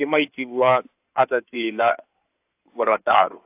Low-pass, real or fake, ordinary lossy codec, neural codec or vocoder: 3.6 kHz; fake; AAC, 32 kbps; codec, 16 kHz in and 24 kHz out, 2.2 kbps, FireRedTTS-2 codec